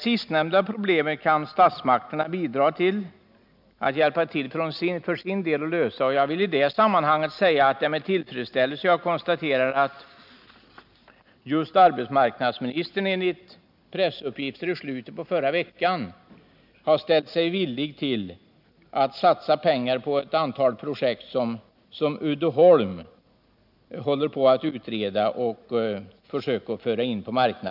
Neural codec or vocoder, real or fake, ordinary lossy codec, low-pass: none; real; none; 5.4 kHz